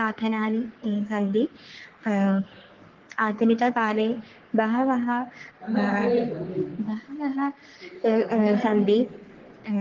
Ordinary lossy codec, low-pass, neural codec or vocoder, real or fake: Opus, 16 kbps; 7.2 kHz; codec, 44.1 kHz, 3.4 kbps, Pupu-Codec; fake